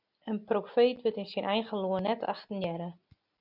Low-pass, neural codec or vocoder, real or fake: 5.4 kHz; none; real